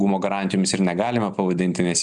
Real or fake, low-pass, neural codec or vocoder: real; 10.8 kHz; none